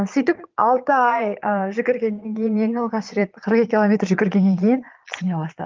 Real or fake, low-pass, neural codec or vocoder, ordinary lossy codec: fake; 7.2 kHz; vocoder, 22.05 kHz, 80 mel bands, Vocos; Opus, 32 kbps